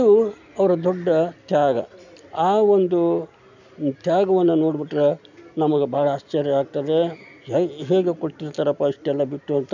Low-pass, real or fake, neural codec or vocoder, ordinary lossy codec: 7.2 kHz; real; none; none